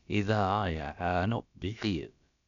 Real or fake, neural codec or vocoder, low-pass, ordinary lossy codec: fake; codec, 16 kHz, about 1 kbps, DyCAST, with the encoder's durations; 7.2 kHz; none